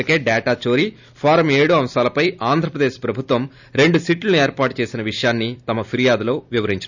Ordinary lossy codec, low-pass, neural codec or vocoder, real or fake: none; 7.2 kHz; none; real